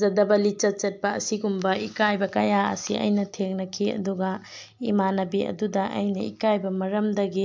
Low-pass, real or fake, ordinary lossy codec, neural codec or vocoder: 7.2 kHz; real; MP3, 64 kbps; none